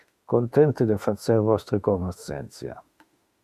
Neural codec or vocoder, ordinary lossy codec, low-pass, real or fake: autoencoder, 48 kHz, 32 numbers a frame, DAC-VAE, trained on Japanese speech; Opus, 64 kbps; 14.4 kHz; fake